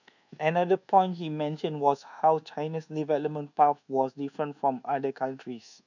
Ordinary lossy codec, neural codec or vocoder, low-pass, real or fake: none; codec, 24 kHz, 1.2 kbps, DualCodec; 7.2 kHz; fake